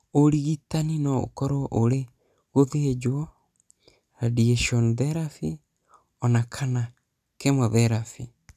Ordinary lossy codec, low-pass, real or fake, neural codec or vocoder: none; 14.4 kHz; real; none